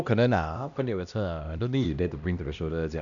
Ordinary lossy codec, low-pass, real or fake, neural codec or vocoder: none; 7.2 kHz; fake; codec, 16 kHz, 1 kbps, X-Codec, HuBERT features, trained on LibriSpeech